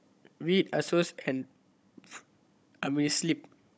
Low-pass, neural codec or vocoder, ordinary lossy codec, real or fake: none; codec, 16 kHz, 16 kbps, FunCodec, trained on Chinese and English, 50 frames a second; none; fake